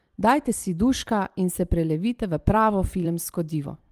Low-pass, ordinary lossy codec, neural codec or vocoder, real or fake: 14.4 kHz; Opus, 24 kbps; vocoder, 44.1 kHz, 128 mel bands every 256 samples, BigVGAN v2; fake